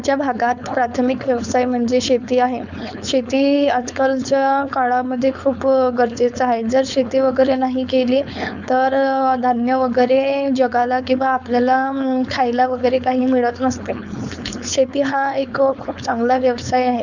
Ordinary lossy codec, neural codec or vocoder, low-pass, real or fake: none; codec, 16 kHz, 4.8 kbps, FACodec; 7.2 kHz; fake